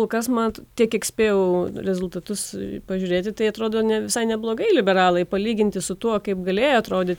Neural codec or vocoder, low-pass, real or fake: none; 19.8 kHz; real